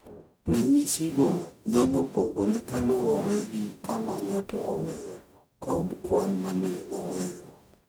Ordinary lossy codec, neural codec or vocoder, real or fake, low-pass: none; codec, 44.1 kHz, 0.9 kbps, DAC; fake; none